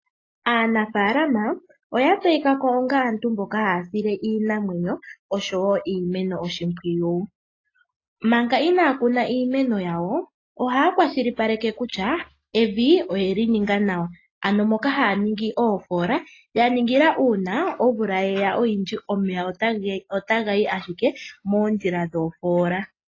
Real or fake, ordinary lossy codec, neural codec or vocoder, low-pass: real; AAC, 32 kbps; none; 7.2 kHz